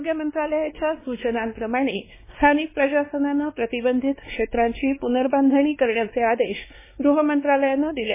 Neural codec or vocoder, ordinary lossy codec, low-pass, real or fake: codec, 16 kHz, 2 kbps, X-Codec, WavLM features, trained on Multilingual LibriSpeech; MP3, 16 kbps; 3.6 kHz; fake